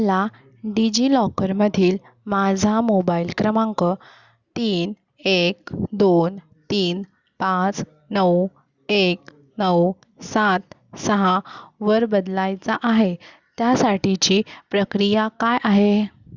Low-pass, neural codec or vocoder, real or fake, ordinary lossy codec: 7.2 kHz; none; real; Opus, 64 kbps